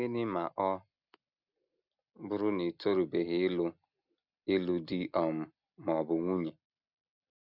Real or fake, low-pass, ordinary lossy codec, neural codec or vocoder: real; 5.4 kHz; none; none